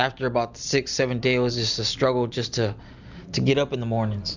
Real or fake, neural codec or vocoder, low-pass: real; none; 7.2 kHz